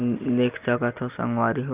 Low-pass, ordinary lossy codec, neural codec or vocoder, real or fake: 3.6 kHz; Opus, 32 kbps; none; real